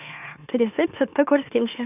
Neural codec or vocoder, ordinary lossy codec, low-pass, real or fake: autoencoder, 44.1 kHz, a latent of 192 numbers a frame, MeloTTS; none; 3.6 kHz; fake